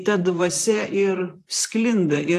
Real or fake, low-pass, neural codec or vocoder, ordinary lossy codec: fake; 14.4 kHz; vocoder, 44.1 kHz, 128 mel bands, Pupu-Vocoder; AAC, 64 kbps